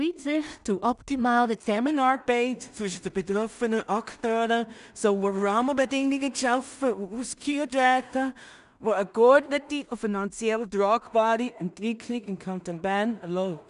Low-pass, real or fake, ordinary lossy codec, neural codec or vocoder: 10.8 kHz; fake; none; codec, 16 kHz in and 24 kHz out, 0.4 kbps, LongCat-Audio-Codec, two codebook decoder